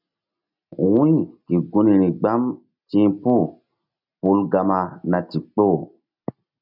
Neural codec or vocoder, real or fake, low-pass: none; real; 5.4 kHz